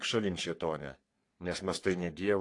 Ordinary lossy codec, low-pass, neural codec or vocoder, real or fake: AAC, 48 kbps; 10.8 kHz; codec, 44.1 kHz, 3.4 kbps, Pupu-Codec; fake